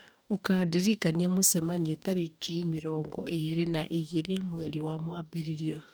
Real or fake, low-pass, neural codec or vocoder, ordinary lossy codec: fake; none; codec, 44.1 kHz, 2.6 kbps, DAC; none